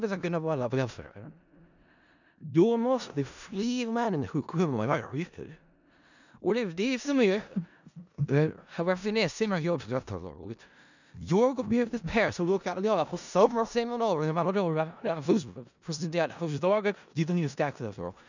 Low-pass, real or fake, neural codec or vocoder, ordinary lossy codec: 7.2 kHz; fake; codec, 16 kHz in and 24 kHz out, 0.4 kbps, LongCat-Audio-Codec, four codebook decoder; none